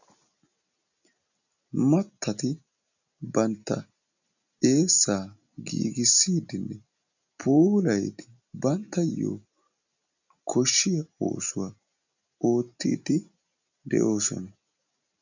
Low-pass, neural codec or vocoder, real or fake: 7.2 kHz; none; real